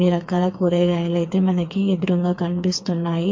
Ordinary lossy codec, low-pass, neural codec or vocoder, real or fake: MP3, 48 kbps; 7.2 kHz; codec, 16 kHz, 4 kbps, FreqCodec, smaller model; fake